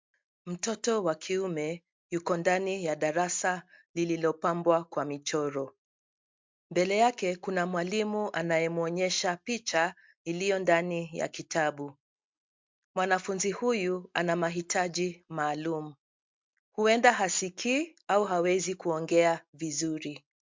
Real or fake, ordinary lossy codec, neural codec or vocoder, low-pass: real; MP3, 64 kbps; none; 7.2 kHz